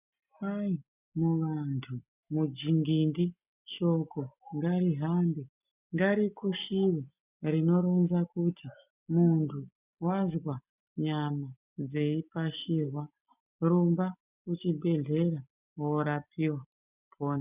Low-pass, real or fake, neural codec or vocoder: 3.6 kHz; real; none